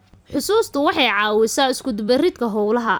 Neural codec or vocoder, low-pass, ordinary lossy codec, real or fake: vocoder, 44.1 kHz, 128 mel bands every 256 samples, BigVGAN v2; none; none; fake